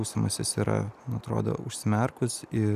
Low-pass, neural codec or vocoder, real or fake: 14.4 kHz; none; real